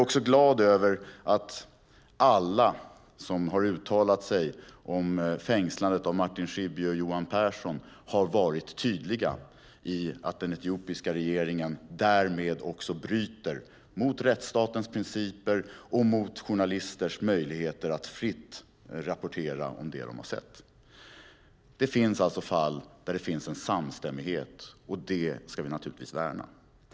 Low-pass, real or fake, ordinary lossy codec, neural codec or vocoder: none; real; none; none